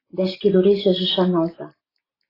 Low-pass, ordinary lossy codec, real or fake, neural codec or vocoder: 5.4 kHz; AAC, 24 kbps; real; none